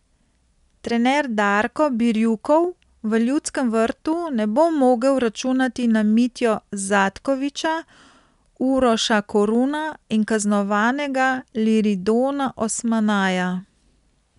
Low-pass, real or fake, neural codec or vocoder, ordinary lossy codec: 10.8 kHz; real; none; none